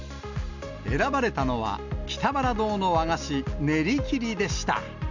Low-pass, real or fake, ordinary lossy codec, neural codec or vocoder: 7.2 kHz; real; none; none